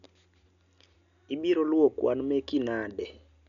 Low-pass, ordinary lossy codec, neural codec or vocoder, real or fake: 7.2 kHz; none; none; real